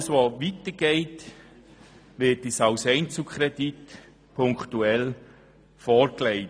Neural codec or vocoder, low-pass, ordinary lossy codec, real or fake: none; none; none; real